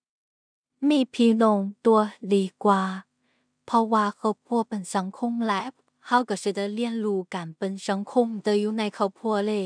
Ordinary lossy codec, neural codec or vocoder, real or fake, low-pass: none; codec, 16 kHz in and 24 kHz out, 0.4 kbps, LongCat-Audio-Codec, two codebook decoder; fake; 9.9 kHz